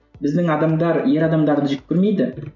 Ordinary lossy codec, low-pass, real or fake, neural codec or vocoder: none; 7.2 kHz; real; none